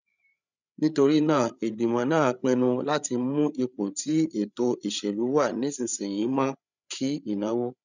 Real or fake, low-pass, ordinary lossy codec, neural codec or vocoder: fake; 7.2 kHz; none; codec, 16 kHz, 8 kbps, FreqCodec, larger model